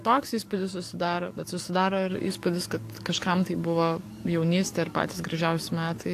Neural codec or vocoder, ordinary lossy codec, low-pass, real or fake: codec, 44.1 kHz, 7.8 kbps, DAC; AAC, 64 kbps; 14.4 kHz; fake